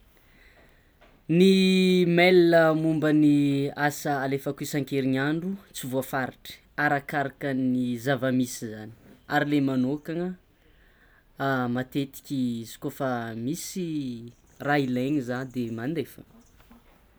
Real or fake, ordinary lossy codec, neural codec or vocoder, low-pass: real; none; none; none